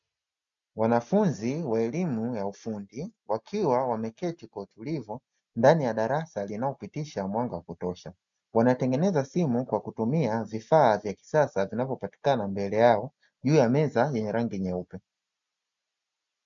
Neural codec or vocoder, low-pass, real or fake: none; 7.2 kHz; real